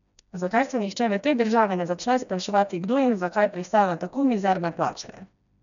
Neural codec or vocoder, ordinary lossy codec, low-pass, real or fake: codec, 16 kHz, 1 kbps, FreqCodec, smaller model; none; 7.2 kHz; fake